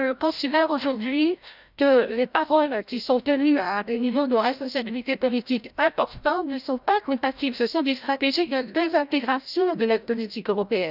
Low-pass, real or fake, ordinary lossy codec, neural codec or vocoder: 5.4 kHz; fake; none; codec, 16 kHz, 0.5 kbps, FreqCodec, larger model